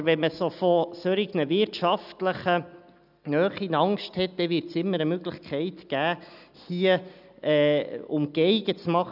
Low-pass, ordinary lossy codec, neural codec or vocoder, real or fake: 5.4 kHz; none; none; real